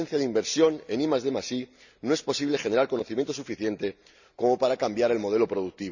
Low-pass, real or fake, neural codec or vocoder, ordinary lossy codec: 7.2 kHz; real; none; none